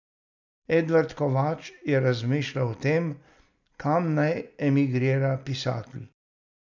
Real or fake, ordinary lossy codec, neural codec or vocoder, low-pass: real; none; none; 7.2 kHz